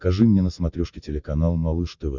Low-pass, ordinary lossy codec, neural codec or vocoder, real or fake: 7.2 kHz; Opus, 64 kbps; none; real